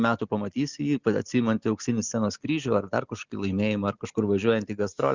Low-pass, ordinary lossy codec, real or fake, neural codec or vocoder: 7.2 kHz; Opus, 64 kbps; real; none